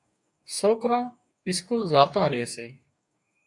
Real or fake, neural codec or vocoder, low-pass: fake; codec, 44.1 kHz, 2.6 kbps, DAC; 10.8 kHz